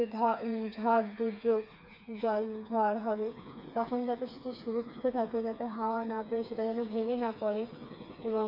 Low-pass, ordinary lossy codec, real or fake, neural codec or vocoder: 5.4 kHz; none; fake; codec, 16 kHz, 4 kbps, FreqCodec, smaller model